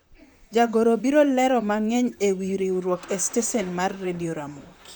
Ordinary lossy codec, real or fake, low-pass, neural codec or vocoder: none; fake; none; vocoder, 44.1 kHz, 128 mel bands, Pupu-Vocoder